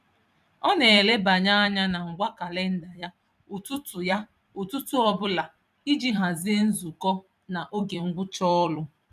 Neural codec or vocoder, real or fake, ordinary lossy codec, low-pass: vocoder, 44.1 kHz, 128 mel bands every 256 samples, BigVGAN v2; fake; none; 14.4 kHz